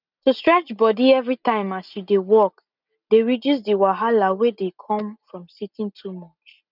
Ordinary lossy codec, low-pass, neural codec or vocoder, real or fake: none; 5.4 kHz; none; real